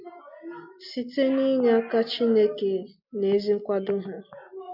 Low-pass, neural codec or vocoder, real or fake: 5.4 kHz; none; real